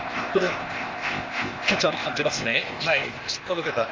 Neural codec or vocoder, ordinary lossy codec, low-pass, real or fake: codec, 16 kHz, 0.8 kbps, ZipCodec; Opus, 32 kbps; 7.2 kHz; fake